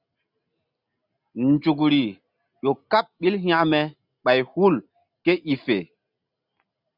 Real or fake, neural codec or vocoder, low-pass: real; none; 5.4 kHz